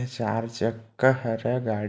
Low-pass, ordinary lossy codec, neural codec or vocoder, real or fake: none; none; none; real